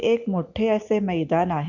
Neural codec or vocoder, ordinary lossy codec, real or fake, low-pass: none; none; real; 7.2 kHz